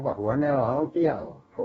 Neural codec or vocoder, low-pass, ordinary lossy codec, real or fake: codec, 44.1 kHz, 2.6 kbps, DAC; 19.8 kHz; AAC, 24 kbps; fake